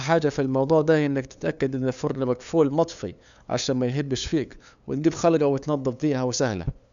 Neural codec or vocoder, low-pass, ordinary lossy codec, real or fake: codec, 16 kHz, 2 kbps, FunCodec, trained on LibriTTS, 25 frames a second; 7.2 kHz; MP3, 96 kbps; fake